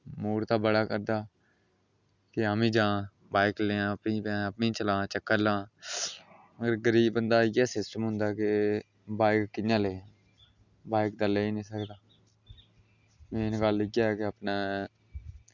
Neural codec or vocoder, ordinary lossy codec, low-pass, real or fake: none; none; 7.2 kHz; real